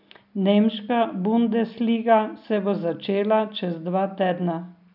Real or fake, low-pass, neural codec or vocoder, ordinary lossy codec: real; 5.4 kHz; none; none